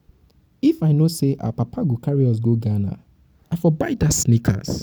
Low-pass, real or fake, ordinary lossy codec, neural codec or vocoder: none; real; none; none